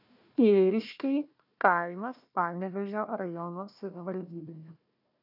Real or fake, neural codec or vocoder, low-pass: fake; codec, 16 kHz, 1 kbps, FunCodec, trained on Chinese and English, 50 frames a second; 5.4 kHz